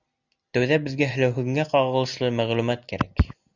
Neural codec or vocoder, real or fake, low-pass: none; real; 7.2 kHz